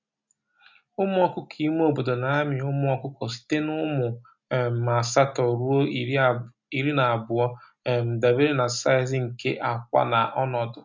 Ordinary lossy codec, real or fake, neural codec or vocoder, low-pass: MP3, 64 kbps; real; none; 7.2 kHz